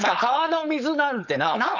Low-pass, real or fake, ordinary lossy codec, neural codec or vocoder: 7.2 kHz; fake; none; codec, 16 kHz, 4.8 kbps, FACodec